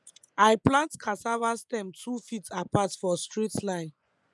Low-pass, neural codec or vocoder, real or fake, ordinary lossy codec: none; vocoder, 24 kHz, 100 mel bands, Vocos; fake; none